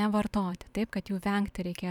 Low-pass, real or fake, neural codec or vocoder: 19.8 kHz; fake; vocoder, 44.1 kHz, 128 mel bands every 512 samples, BigVGAN v2